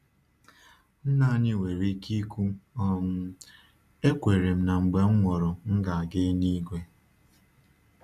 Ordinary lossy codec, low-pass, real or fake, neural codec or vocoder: none; 14.4 kHz; real; none